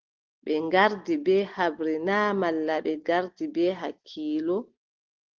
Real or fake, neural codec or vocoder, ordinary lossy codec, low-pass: real; none; Opus, 16 kbps; 7.2 kHz